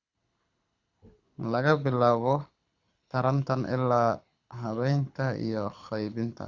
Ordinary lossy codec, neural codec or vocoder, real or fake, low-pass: Opus, 64 kbps; codec, 24 kHz, 6 kbps, HILCodec; fake; 7.2 kHz